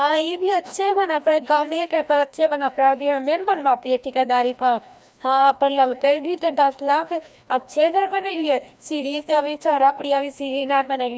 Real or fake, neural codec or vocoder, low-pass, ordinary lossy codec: fake; codec, 16 kHz, 1 kbps, FreqCodec, larger model; none; none